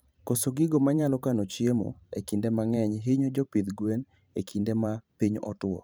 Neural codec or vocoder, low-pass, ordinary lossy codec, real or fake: vocoder, 44.1 kHz, 128 mel bands every 512 samples, BigVGAN v2; none; none; fake